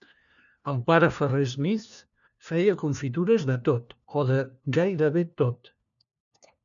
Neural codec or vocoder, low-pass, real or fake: codec, 16 kHz, 1 kbps, FunCodec, trained on LibriTTS, 50 frames a second; 7.2 kHz; fake